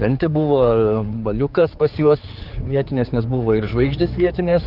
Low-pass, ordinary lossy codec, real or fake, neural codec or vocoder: 5.4 kHz; Opus, 24 kbps; fake; codec, 16 kHz, 4 kbps, X-Codec, HuBERT features, trained on general audio